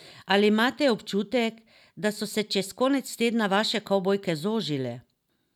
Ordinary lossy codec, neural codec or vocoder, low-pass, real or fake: none; none; 19.8 kHz; real